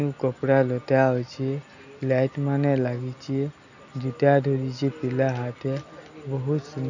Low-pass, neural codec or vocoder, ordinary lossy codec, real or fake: 7.2 kHz; none; none; real